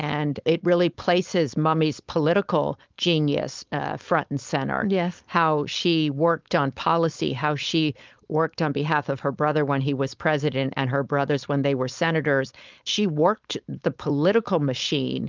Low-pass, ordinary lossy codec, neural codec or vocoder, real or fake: 7.2 kHz; Opus, 32 kbps; codec, 16 kHz, 4.8 kbps, FACodec; fake